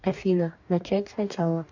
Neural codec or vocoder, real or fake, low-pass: codec, 44.1 kHz, 2.6 kbps, DAC; fake; 7.2 kHz